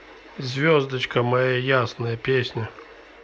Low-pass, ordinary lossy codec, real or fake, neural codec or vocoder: none; none; real; none